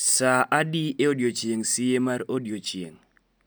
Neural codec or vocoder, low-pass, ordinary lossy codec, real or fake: none; none; none; real